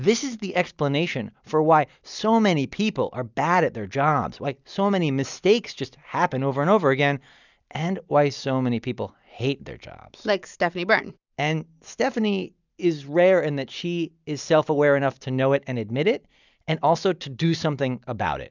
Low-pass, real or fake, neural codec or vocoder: 7.2 kHz; real; none